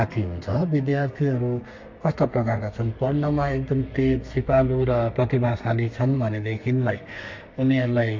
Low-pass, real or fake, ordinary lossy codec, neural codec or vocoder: 7.2 kHz; fake; MP3, 48 kbps; codec, 32 kHz, 1.9 kbps, SNAC